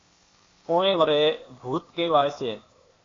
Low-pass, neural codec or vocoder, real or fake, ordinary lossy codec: 7.2 kHz; codec, 16 kHz, 0.8 kbps, ZipCodec; fake; AAC, 32 kbps